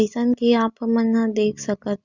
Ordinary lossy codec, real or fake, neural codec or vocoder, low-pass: Opus, 64 kbps; real; none; 7.2 kHz